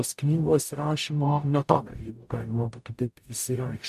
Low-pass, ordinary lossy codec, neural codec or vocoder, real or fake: 14.4 kHz; MP3, 96 kbps; codec, 44.1 kHz, 0.9 kbps, DAC; fake